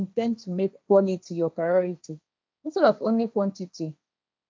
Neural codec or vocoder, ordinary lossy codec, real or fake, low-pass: codec, 16 kHz, 1.1 kbps, Voila-Tokenizer; none; fake; none